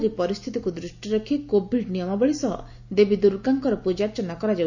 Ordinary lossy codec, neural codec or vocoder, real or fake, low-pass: none; none; real; 7.2 kHz